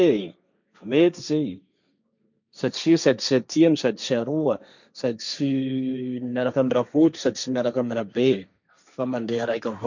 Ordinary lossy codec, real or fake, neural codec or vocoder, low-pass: none; fake; codec, 16 kHz, 1.1 kbps, Voila-Tokenizer; 7.2 kHz